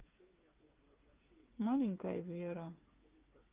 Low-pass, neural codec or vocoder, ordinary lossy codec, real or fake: 3.6 kHz; codec, 16 kHz, 8 kbps, FreqCodec, smaller model; Opus, 24 kbps; fake